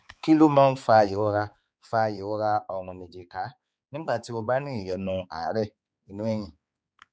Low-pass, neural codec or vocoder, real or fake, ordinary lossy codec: none; codec, 16 kHz, 4 kbps, X-Codec, HuBERT features, trained on balanced general audio; fake; none